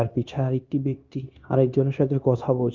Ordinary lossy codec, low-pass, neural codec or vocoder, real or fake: Opus, 32 kbps; 7.2 kHz; codec, 16 kHz, 0.9 kbps, LongCat-Audio-Codec; fake